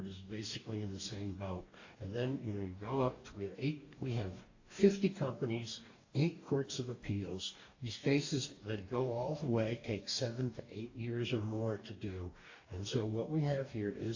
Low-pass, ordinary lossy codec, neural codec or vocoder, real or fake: 7.2 kHz; AAC, 48 kbps; codec, 44.1 kHz, 2.6 kbps, DAC; fake